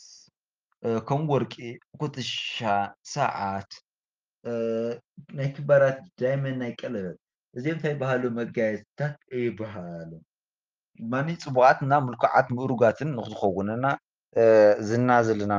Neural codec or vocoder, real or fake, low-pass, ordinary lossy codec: none; real; 7.2 kHz; Opus, 24 kbps